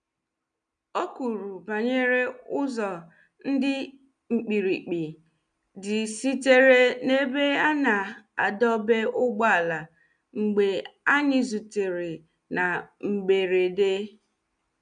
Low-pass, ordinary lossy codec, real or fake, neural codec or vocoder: 9.9 kHz; none; real; none